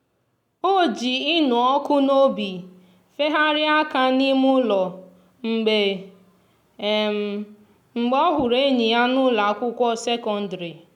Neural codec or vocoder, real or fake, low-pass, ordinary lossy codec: none; real; 19.8 kHz; none